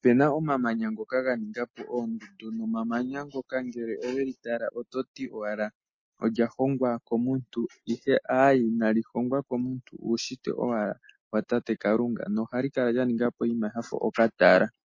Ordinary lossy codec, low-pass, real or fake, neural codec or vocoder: MP3, 32 kbps; 7.2 kHz; real; none